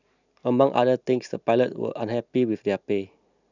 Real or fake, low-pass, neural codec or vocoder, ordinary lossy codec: real; 7.2 kHz; none; none